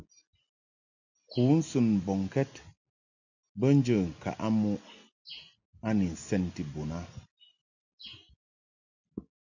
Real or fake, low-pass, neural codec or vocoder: real; 7.2 kHz; none